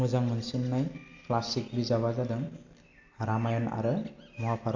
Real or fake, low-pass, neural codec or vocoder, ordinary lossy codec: real; 7.2 kHz; none; AAC, 48 kbps